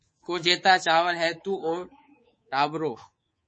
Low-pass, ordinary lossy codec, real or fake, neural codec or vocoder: 9.9 kHz; MP3, 32 kbps; fake; codec, 24 kHz, 3.1 kbps, DualCodec